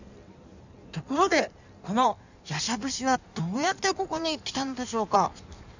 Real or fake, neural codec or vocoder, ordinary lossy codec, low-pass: fake; codec, 16 kHz in and 24 kHz out, 1.1 kbps, FireRedTTS-2 codec; none; 7.2 kHz